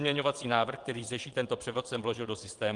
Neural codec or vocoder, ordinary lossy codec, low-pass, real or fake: vocoder, 22.05 kHz, 80 mel bands, WaveNeXt; Opus, 24 kbps; 9.9 kHz; fake